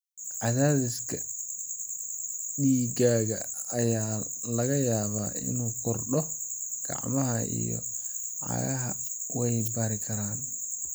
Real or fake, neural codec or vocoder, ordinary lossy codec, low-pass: real; none; none; none